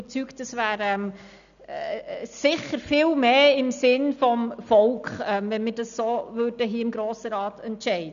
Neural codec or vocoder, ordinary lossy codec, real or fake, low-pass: none; none; real; 7.2 kHz